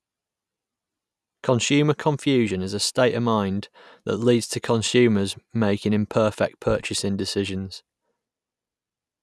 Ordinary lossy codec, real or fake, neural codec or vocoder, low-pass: none; real; none; none